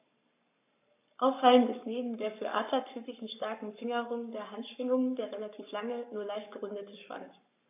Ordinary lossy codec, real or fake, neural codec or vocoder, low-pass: AAC, 32 kbps; fake; codec, 44.1 kHz, 7.8 kbps, Pupu-Codec; 3.6 kHz